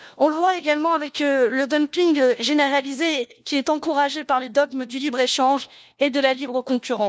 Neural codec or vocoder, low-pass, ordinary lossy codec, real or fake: codec, 16 kHz, 1 kbps, FunCodec, trained on LibriTTS, 50 frames a second; none; none; fake